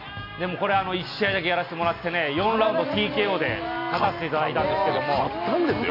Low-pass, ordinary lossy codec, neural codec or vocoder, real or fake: 5.4 kHz; none; none; real